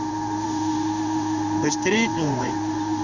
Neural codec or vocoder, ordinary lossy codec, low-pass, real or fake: codec, 16 kHz in and 24 kHz out, 1 kbps, XY-Tokenizer; none; 7.2 kHz; fake